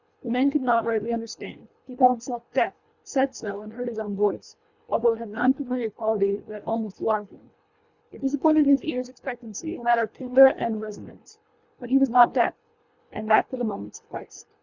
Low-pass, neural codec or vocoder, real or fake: 7.2 kHz; codec, 24 kHz, 3 kbps, HILCodec; fake